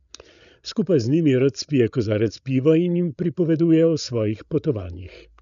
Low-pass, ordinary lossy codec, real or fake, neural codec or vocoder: 7.2 kHz; none; fake; codec, 16 kHz, 8 kbps, FreqCodec, larger model